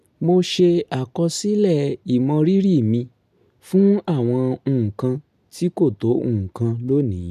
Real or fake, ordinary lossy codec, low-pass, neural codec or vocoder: real; none; 14.4 kHz; none